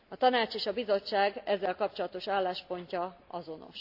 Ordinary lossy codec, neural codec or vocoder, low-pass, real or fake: AAC, 48 kbps; none; 5.4 kHz; real